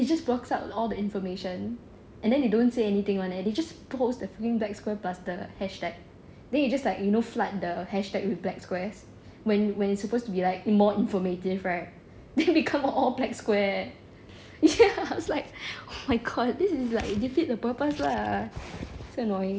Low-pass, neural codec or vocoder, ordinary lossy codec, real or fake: none; none; none; real